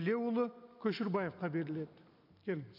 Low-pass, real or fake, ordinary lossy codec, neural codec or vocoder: 5.4 kHz; real; none; none